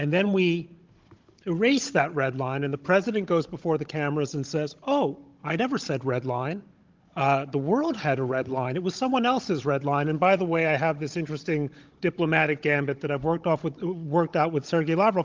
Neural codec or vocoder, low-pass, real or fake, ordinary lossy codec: codec, 16 kHz, 16 kbps, FunCodec, trained on Chinese and English, 50 frames a second; 7.2 kHz; fake; Opus, 32 kbps